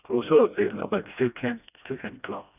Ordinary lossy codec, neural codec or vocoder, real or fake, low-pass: none; codec, 16 kHz, 1 kbps, FreqCodec, smaller model; fake; 3.6 kHz